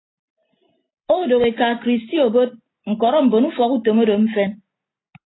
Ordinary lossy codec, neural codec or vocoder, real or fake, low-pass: AAC, 16 kbps; none; real; 7.2 kHz